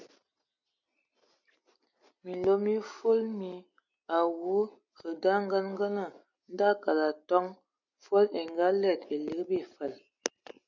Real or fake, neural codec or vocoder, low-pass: real; none; 7.2 kHz